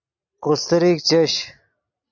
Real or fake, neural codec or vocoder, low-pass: real; none; 7.2 kHz